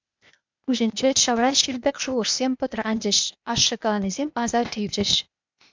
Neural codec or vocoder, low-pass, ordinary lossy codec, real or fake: codec, 16 kHz, 0.8 kbps, ZipCodec; 7.2 kHz; MP3, 64 kbps; fake